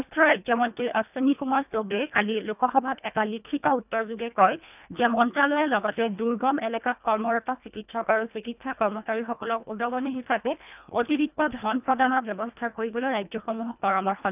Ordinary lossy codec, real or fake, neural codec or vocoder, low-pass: none; fake; codec, 24 kHz, 1.5 kbps, HILCodec; 3.6 kHz